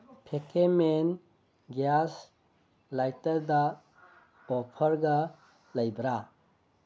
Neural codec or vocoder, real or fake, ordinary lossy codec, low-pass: none; real; none; none